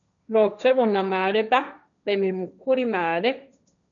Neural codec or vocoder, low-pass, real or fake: codec, 16 kHz, 1.1 kbps, Voila-Tokenizer; 7.2 kHz; fake